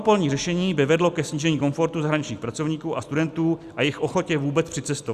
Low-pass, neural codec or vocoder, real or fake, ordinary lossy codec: 14.4 kHz; none; real; Opus, 64 kbps